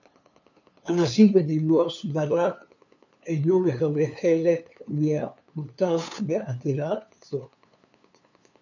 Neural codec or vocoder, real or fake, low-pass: codec, 16 kHz, 2 kbps, FunCodec, trained on LibriTTS, 25 frames a second; fake; 7.2 kHz